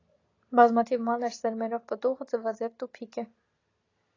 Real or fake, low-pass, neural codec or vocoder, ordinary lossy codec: real; 7.2 kHz; none; AAC, 32 kbps